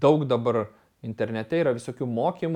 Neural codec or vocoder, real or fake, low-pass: none; real; 19.8 kHz